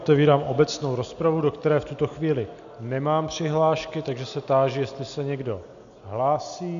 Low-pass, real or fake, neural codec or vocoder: 7.2 kHz; real; none